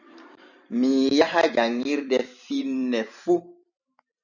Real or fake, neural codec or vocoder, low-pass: fake; vocoder, 44.1 kHz, 128 mel bands every 512 samples, BigVGAN v2; 7.2 kHz